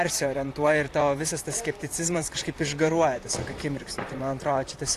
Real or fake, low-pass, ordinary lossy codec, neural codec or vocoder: fake; 14.4 kHz; AAC, 64 kbps; vocoder, 44.1 kHz, 128 mel bands, Pupu-Vocoder